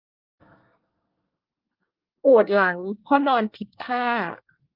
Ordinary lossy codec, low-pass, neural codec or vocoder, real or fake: Opus, 24 kbps; 5.4 kHz; codec, 24 kHz, 1 kbps, SNAC; fake